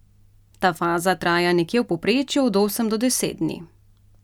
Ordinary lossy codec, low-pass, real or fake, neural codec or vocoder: none; 19.8 kHz; real; none